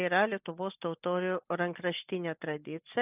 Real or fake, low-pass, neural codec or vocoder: real; 3.6 kHz; none